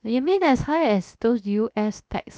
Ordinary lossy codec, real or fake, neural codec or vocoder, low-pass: none; fake; codec, 16 kHz, 0.7 kbps, FocalCodec; none